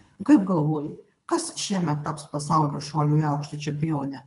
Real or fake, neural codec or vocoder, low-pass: fake; codec, 24 kHz, 3 kbps, HILCodec; 10.8 kHz